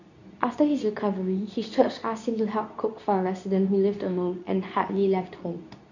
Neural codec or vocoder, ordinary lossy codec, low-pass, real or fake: codec, 24 kHz, 0.9 kbps, WavTokenizer, medium speech release version 2; none; 7.2 kHz; fake